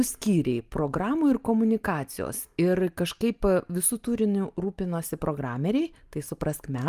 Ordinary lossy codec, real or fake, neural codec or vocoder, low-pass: Opus, 32 kbps; real; none; 14.4 kHz